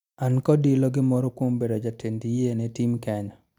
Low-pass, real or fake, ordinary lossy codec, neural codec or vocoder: 19.8 kHz; real; none; none